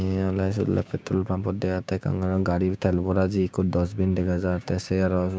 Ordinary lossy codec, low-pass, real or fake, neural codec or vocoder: none; none; fake; codec, 16 kHz, 6 kbps, DAC